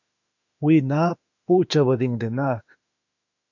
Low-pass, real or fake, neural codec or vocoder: 7.2 kHz; fake; autoencoder, 48 kHz, 32 numbers a frame, DAC-VAE, trained on Japanese speech